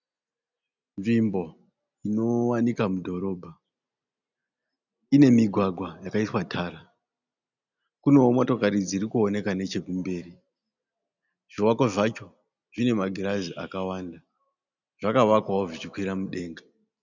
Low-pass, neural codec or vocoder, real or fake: 7.2 kHz; none; real